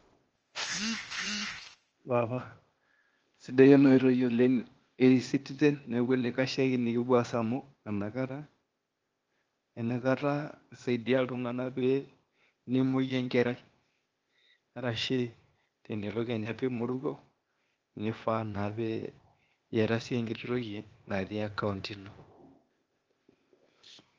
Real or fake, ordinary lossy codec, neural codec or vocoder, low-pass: fake; Opus, 24 kbps; codec, 16 kHz, 0.8 kbps, ZipCodec; 7.2 kHz